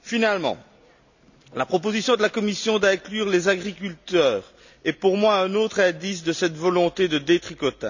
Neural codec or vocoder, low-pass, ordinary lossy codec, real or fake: none; 7.2 kHz; none; real